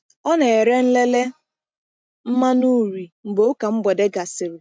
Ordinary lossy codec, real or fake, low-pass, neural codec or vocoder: none; real; none; none